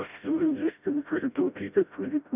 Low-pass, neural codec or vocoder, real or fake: 3.6 kHz; codec, 16 kHz, 0.5 kbps, FreqCodec, smaller model; fake